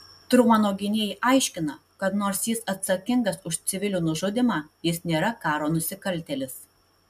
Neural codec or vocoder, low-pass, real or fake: none; 14.4 kHz; real